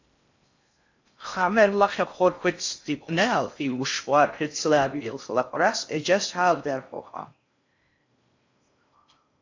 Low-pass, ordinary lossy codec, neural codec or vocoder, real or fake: 7.2 kHz; AAC, 48 kbps; codec, 16 kHz in and 24 kHz out, 0.6 kbps, FocalCodec, streaming, 4096 codes; fake